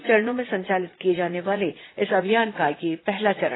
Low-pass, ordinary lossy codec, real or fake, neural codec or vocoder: 7.2 kHz; AAC, 16 kbps; fake; vocoder, 44.1 kHz, 80 mel bands, Vocos